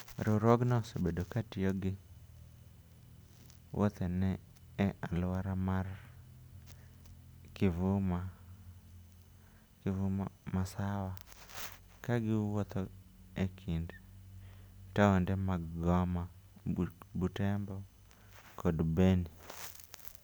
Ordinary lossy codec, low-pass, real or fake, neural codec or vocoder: none; none; real; none